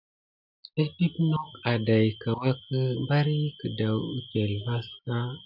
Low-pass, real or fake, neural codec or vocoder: 5.4 kHz; real; none